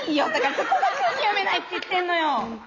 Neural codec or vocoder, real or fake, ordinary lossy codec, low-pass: none; real; none; 7.2 kHz